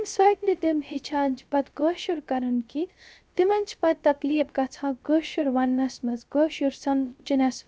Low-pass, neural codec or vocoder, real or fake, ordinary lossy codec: none; codec, 16 kHz, 0.3 kbps, FocalCodec; fake; none